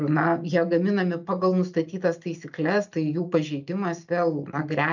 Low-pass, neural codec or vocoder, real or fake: 7.2 kHz; none; real